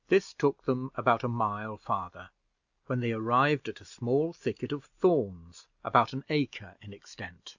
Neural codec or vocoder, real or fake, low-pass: none; real; 7.2 kHz